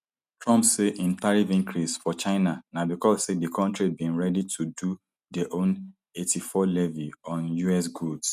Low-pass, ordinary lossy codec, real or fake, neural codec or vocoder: 14.4 kHz; none; real; none